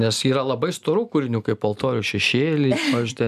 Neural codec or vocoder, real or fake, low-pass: none; real; 14.4 kHz